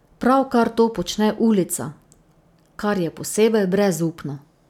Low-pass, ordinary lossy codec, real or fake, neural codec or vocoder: 19.8 kHz; none; real; none